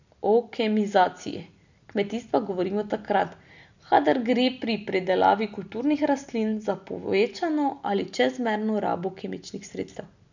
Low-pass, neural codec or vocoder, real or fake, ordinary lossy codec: 7.2 kHz; none; real; none